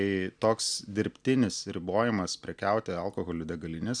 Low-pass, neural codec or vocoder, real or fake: 9.9 kHz; none; real